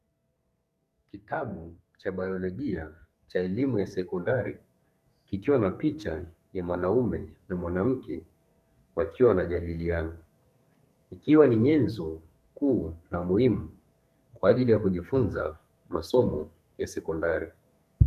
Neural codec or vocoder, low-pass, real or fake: codec, 44.1 kHz, 2.6 kbps, SNAC; 14.4 kHz; fake